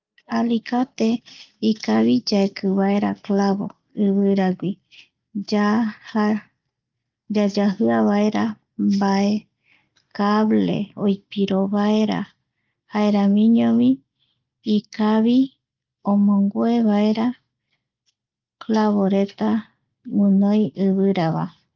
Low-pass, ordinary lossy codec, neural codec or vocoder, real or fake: 7.2 kHz; Opus, 32 kbps; none; real